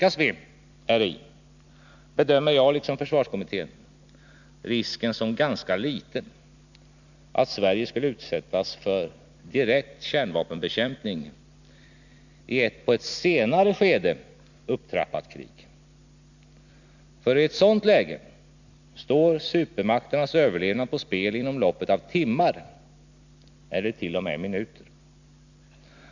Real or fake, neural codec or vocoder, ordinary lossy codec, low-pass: real; none; none; 7.2 kHz